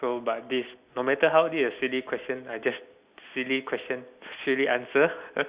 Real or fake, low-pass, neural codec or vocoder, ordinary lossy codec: real; 3.6 kHz; none; Opus, 64 kbps